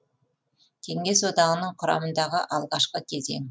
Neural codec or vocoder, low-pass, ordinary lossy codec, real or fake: none; none; none; real